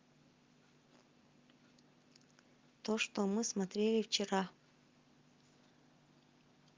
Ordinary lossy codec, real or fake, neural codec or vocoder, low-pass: Opus, 32 kbps; real; none; 7.2 kHz